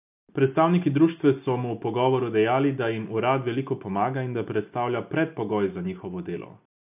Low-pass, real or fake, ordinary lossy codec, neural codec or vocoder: 3.6 kHz; real; none; none